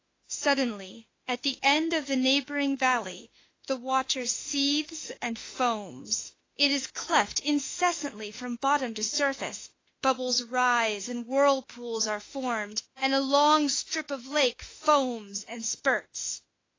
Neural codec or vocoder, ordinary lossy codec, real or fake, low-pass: autoencoder, 48 kHz, 32 numbers a frame, DAC-VAE, trained on Japanese speech; AAC, 32 kbps; fake; 7.2 kHz